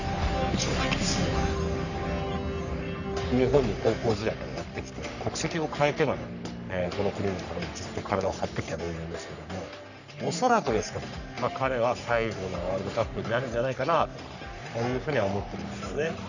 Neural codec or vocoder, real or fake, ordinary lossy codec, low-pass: codec, 44.1 kHz, 3.4 kbps, Pupu-Codec; fake; none; 7.2 kHz